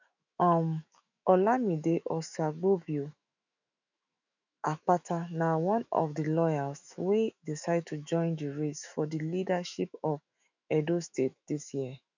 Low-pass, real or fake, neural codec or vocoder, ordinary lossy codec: 7.2 kHz; fake; autoencoder, 48 kHz, 128 numbers a frame, DAC-VAE, trained on Japanese speech; none